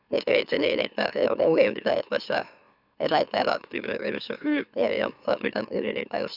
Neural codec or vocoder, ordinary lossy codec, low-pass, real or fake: autoencoder, 44.1 kHz, a latent of 192 numbers a frame, MeloTTS; none; 5.4 kHz; fake